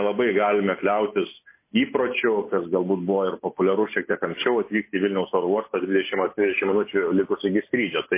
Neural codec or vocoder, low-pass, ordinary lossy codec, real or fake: none; 3.6 kHz; MP3, 24 kbps; real